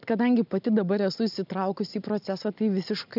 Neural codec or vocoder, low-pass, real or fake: none; 5.4 kHz; real